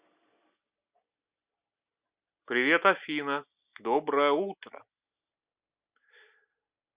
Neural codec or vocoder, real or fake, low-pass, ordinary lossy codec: none; real; 3.6 kHz; Opus, 64 kbps